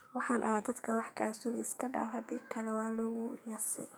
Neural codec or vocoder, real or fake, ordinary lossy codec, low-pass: codec, 44.1 kHz, 2.6 kbps, SNAC; fake; none; none